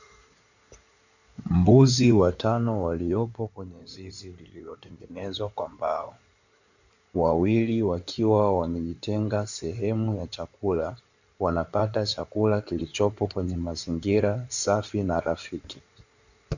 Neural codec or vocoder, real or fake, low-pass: codec, 16 kHz in and 24 kHz out, 2.2 kbps, FireRedTTS-2 codec; fake; 7.2 kHz